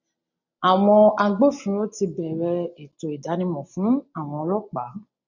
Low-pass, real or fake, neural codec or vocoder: 7.2 kHz; real; none